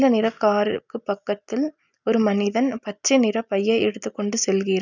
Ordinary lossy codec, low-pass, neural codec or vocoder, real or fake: none; 7.2 kHz; none; real